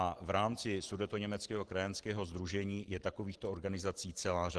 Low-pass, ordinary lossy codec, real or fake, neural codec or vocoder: 10.8 kHz; Opus, 16 kbps; real; none